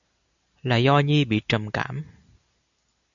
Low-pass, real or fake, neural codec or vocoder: 7.2 kHz; real; none